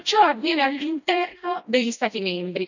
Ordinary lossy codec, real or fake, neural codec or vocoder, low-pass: none; fake; codec, 16 kHz, 1 kbps, FreqCodec, smaller model; 7.2 kHz